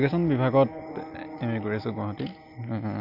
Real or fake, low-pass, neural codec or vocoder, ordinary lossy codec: real; 5.4 kHz; none; MP3, 48 kbps